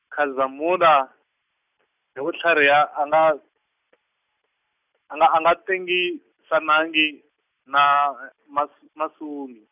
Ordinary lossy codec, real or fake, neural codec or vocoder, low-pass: none; real; none; 3.6 kHz